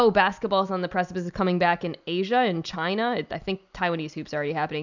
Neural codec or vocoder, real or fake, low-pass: none; real; 7.2 kHz